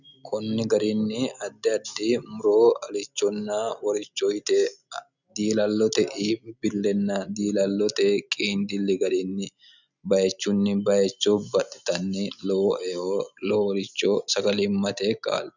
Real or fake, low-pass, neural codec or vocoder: real; 7.2 kHz; none